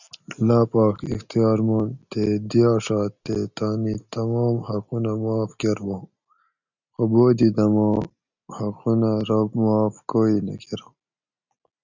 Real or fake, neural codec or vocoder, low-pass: real; none; 7.2 kHz